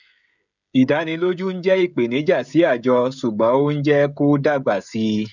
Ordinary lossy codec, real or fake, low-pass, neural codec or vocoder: none; fake; 7.2 kHz; codec, 16 kHz, 16 kbps, FreqCodec, smaller model